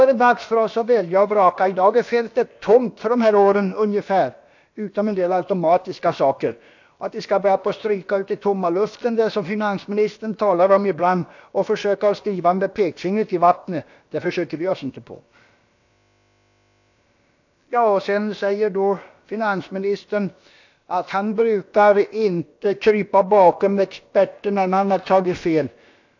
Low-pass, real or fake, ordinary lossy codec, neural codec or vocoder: 7.2 kHz; fake; AAC, 48 kbps; codec, 16 kHz, about 1 kbps, DyCAST, with the encoder's durations